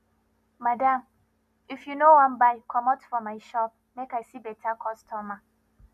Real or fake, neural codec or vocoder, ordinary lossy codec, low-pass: real; none; none; 14.4 kHz